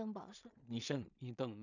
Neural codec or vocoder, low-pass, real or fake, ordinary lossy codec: codec, 16 kHz in and 24 kHz out, 0.4 kbps, LongCat-Audio-Codec, two codebook decoder; 7.2 kHz; fake; none